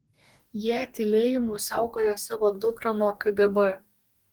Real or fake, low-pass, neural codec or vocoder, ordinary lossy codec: fake; 19.8 kHz; codec, 44.1 kHz, 2.6 kbps, DAC; Opus, 24 kbps